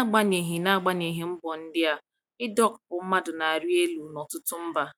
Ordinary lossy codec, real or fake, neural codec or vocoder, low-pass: none; real; none; 19.8 kHz